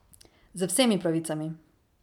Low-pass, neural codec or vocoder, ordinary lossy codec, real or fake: 19.8 kHz; none; none; real